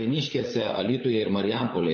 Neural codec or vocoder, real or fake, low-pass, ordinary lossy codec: codec, 16 kHz, 16 kbps, FunCodec, trained on LibriTTS, 50 frames a second; fake; 7.2 kHz; MP3, 32 kbps